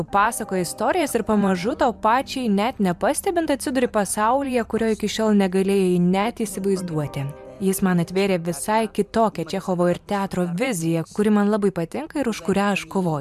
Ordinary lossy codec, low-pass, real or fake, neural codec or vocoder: MP3, 96 kbps; 14.4 kHz; fake; vocoder, 44.1 kHz, 128 mel bands every 512 samples, BigVGAN v2